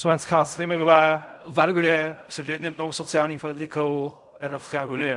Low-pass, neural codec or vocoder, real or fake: 10.8 kHz; codec, 16 kHz in and 24 kHz out, 0.4 kbps, LongCat-Audio-Codec, fine tuned four codebook decoder; fake